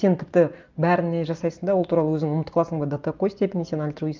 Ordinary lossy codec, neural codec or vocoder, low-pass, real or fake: Opus, 24 kbps; none; 7.2 kHz; real